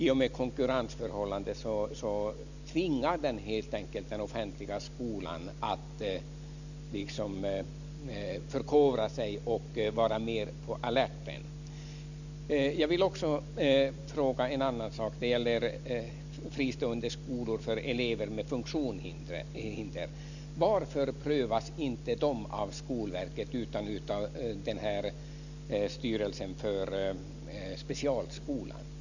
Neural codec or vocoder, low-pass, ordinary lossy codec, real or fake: none; 7.2 kHz; none; real